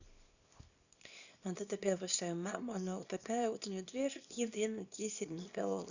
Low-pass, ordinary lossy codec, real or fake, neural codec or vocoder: 7.2 kHz; none; fake; codec, 24 kHz, 0.9 kbps, WavTokenizer, small release